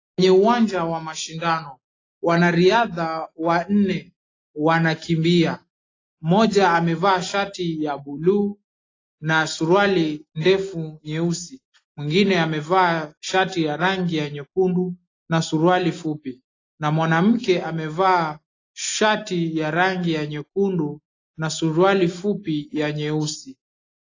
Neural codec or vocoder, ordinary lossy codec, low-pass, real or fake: none; AAC, 32 kbps; 7.2 kHz; real